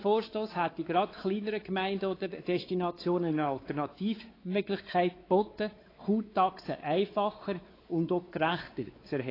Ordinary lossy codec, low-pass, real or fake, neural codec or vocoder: AAC, 24 kbps; 5.4 kHz; fake; codec, 16 kHz, 4 kbps, X-Codec, WavLM features, trained on Multilingual LibriSpeech